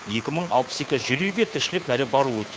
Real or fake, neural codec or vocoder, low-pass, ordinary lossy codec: fake; codec, 16 kHz, 2 kbps, FunCodec, trained on Chinese and English, 25 frames a second; none; none